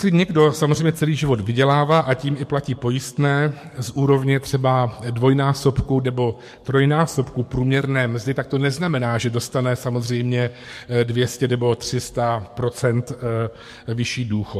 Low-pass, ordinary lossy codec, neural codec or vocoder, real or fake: 14.4 kHz; MP3, 64 kbps; codec, 44.1 kHz, 7.8 kbps, DAC; fake